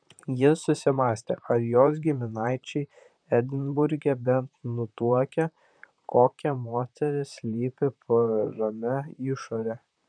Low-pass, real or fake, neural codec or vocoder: 9.9 kHz; fake; vocoder, 44.1 kHz, 128 mel bands, Pupu-Vocoder